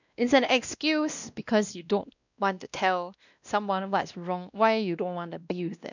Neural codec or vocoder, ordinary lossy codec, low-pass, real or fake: codec, 16 kHz, 1 kbps, X-Codec, WavLM features, trained on Multilingual LibriSpeech; none; 7.2 kHz; fake